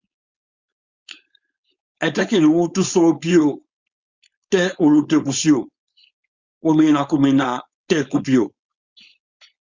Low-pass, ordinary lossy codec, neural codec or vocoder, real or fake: 7.2 kHz; Opus, 64 kbps; codec, 16 kHz, 4.8 kbps, FACodec; fake